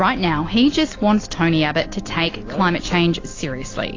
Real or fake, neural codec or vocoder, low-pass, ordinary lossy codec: real; none; 7.2 kHz; AAC, 32 kbps